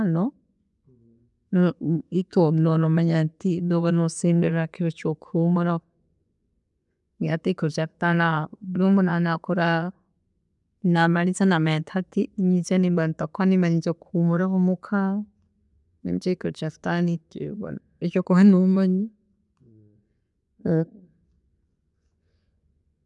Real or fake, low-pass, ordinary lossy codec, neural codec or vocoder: fake; 10.8 kHz; none; vocoder, 24 kHz, 100 mel bands, Vocos